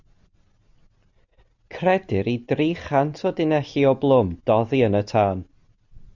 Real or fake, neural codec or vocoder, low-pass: real; none; 7.2 kHz